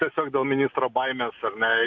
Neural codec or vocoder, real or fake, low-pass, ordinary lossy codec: none; real; 7.2 kHz; MP3, 64 kbps